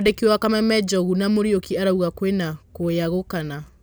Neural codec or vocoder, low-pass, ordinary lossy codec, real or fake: none; none; none; real